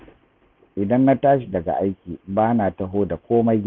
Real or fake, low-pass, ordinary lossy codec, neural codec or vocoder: real; 7.2 kHz; none; none